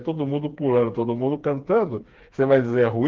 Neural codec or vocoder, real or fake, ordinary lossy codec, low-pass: codec, 16 kHz, 4 kbps, FreqCodec, smaller model; fake; Opus, 16 kbps; 7.2 kHz